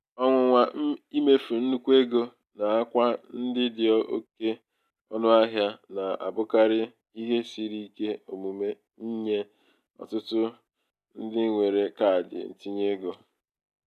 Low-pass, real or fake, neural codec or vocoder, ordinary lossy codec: 14.4 kHz; real; none; none